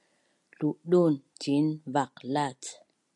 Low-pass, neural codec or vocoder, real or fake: 10.8 kHz; none; real